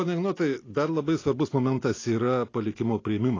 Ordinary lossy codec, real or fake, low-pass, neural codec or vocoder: AAC, 32 kbps; real; 7.2 kHz; none